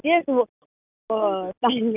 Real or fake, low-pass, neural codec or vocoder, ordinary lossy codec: real; 3.6 kHz; none; none